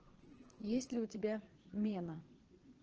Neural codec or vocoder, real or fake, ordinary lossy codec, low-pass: vocoder, 22.05 kHz, 80 mel bands, Vocos; fake; Opus, 16 kbps; 7.2 kHz